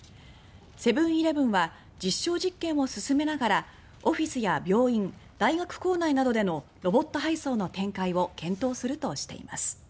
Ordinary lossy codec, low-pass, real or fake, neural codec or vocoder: none; none; real; none